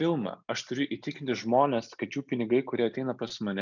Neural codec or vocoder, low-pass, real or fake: none; 7.2 kHz; real